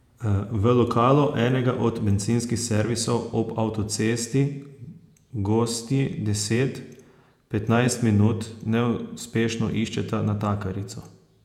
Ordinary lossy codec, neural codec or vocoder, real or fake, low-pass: none; vocoder, 48 kHz, 128 mel bands, Vocos; fake; 19.8 kHz